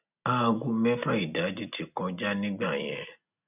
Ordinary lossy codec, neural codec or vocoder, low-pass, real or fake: none; none; 3.6 kHz; real